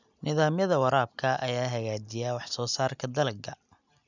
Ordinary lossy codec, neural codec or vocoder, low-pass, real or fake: none; none; 7.2 kHz; real